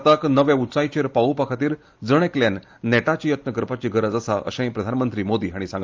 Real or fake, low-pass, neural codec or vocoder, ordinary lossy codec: real; 7.2 kHz; none; Opus, 32 kbps